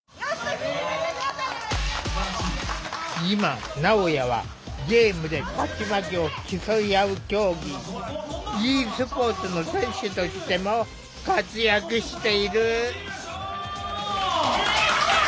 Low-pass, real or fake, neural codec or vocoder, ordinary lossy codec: none; real; none; none